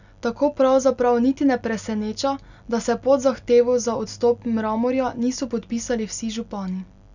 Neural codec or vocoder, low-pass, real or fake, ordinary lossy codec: none; 7.2 kHz; real; none